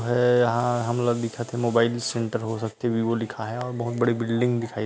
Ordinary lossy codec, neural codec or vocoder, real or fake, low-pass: none; none; real; none